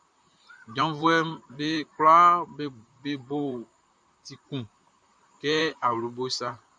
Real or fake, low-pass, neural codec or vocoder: fake; 9.9 kHz; vocoder, 44.1 kHz, 128 mel bands, Pupu-Vocoder